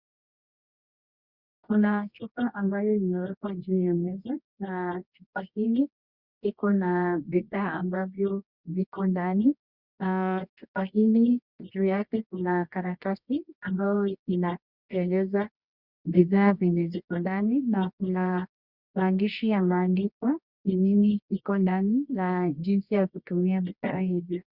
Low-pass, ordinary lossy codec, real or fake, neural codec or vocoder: 5.4 kHz; Opus, 64 kbps; fake; codec, 24 kHz, 0.9 kbps, WavTokenizer, medium music audio release